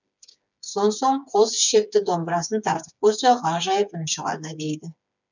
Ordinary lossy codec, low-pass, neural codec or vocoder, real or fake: none; 7.2 kHz; codec, 16 kHz, 4 kbps, FreqCodec, smaller model; fake